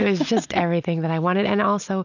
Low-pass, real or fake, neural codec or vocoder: 7.2 kHz; real; none